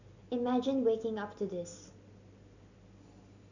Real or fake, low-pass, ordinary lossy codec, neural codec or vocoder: real; 7.2 kHz; none; none